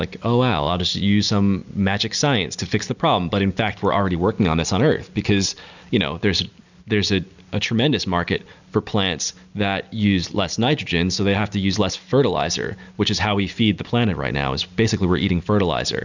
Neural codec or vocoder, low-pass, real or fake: none; 7.2 kHz; real